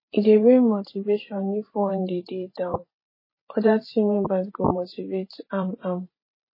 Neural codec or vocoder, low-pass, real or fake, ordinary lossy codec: vocoder, 44.1 kHz, 128 mel bands every 512 samples, BigVGAN v2; 5.4 kHz; fake; MP3, 24 kbps